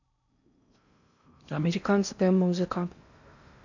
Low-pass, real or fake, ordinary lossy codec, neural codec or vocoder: 7.2 kHz; fake; none; codec, 16 kHz in and 24 kHz out, 0.6 kbps, FocalCodec, streaming, 4096 codes